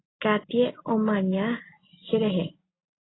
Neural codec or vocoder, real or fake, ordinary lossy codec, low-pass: none; real; AAC, 16 kbps; 7.2 kHz